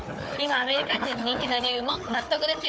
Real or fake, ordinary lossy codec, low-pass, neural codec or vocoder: fake; none; none; codec, 16 kHz, 4 kbps, FunCodec, trained on Chinese and English, 50 frames a second